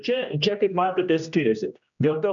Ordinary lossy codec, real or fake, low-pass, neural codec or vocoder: MP3, 96 kbps; fake; 7.2 kHz; codec, 16 kHz, 1 kbps, X-Codec, HuBERT features, trained on general audio